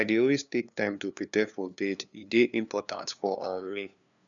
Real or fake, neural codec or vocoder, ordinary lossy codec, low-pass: fake; codec, 16 kHz, 2 kbps, FunCodec, trained on LibriTTS, 25 frames a second; none; 7.2 kHz